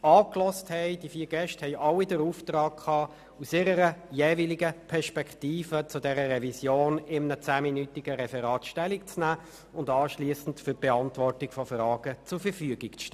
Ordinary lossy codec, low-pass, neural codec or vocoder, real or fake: none; 14.4 kHz; none; real